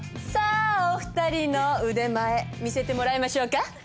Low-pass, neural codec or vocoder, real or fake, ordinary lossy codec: none; none; real; none